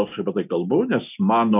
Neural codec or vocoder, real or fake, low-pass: none; real; 3.6 kHz